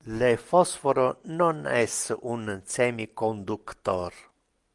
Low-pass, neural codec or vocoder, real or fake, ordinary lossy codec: 10.8 kHz; none; real; Opus, 32 kbps